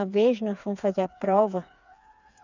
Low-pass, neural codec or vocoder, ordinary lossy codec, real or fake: 7.2 kHz; codec, 16 kHz, 4 kbps, FreqCodec, smaller model; none; fake